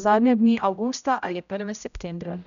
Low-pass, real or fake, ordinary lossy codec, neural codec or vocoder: 7.2 kHz; fake; none; codec, 16 kHz, 0.5 kbps, X-Codec, HuBERT features, trained on general audio